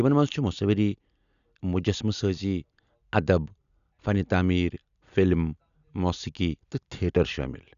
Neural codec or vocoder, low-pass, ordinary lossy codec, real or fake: none; 7.2 kHz; none; real